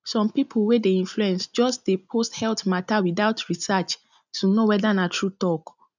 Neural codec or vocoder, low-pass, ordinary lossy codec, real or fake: none; 7.2 kHz; none; real